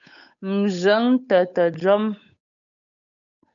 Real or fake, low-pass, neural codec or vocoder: fake; 7.2 kHz; codec, 16 kHz, 8 kbps, FunCodec, trained on Chinese and English, 25 frames a second